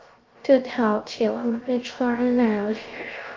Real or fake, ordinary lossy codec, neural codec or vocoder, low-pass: fake; Opus, 24 kbps; codec, 16 kHz, 0.3 kbps, FocalCodec; 7.2 kHz